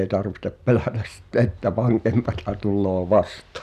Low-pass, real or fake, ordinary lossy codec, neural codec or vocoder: 14.4 kHz; real; none; none